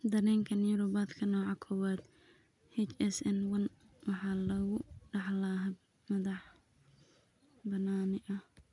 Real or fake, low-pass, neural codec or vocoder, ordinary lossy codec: real; 10.8 kHz; none; none